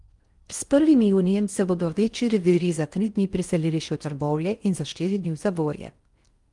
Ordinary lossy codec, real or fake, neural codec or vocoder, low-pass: Opus, 32 kbps; fake; codec, 16 kHz in and 24 kHz out, 0.6 kbps, FocalCodec, streaming, 4096 codes; 10.8 kHz